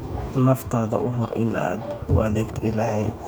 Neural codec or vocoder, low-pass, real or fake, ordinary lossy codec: codec, 44.1 kHz, 2.6 kbps, DAC; none; fake; none